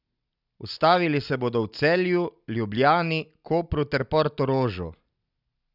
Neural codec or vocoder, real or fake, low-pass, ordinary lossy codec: none; real; 5.4 kHz; none